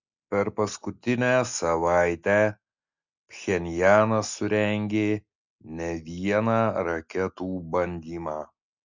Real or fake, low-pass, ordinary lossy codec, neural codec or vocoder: real; 7.2 kHz; Opus, 64 kbps; none